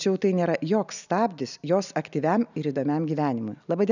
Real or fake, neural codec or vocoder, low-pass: real; none; 7.2 kHz